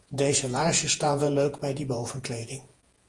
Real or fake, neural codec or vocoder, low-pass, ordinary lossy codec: fake; vocoder, 48 kHz, 128 mel bands, Vocos; 10.8 kHz; Opus, 24 kbps